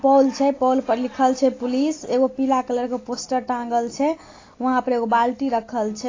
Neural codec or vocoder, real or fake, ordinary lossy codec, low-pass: none; real; AAC, 32 kbps; 7.2 kHz